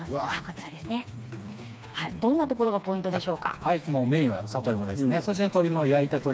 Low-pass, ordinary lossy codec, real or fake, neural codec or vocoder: none; none; fake; codec, 16 kHz, 2 kbps, FreqCodec, smaller model